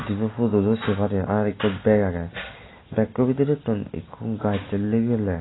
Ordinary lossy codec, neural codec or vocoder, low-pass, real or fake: AAC, 16 kbps; none; 7.2 kHz; real